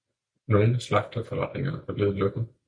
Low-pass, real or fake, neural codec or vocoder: 9.9 kHz; real; none